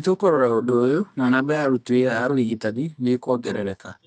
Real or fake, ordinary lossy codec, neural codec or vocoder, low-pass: fake; none; codec, 24 kHz, 0.9 kbps, WavTokenizer, medium music audio release; 10.8 kHz